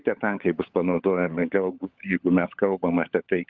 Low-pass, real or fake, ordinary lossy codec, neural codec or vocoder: 7.2 kHz; fake; Opus, 24 kbps; codec, 16 kHz, 16 kbps, FunCodec, trained on LibriTTS, 50 frames a second